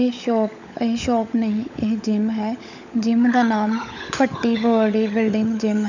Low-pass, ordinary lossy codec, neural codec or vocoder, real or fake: 7.2 kHz; none; codec, 16 kHz, 16 kbps, FunCodec, trained on LibriTTS, 50 frames a second; fake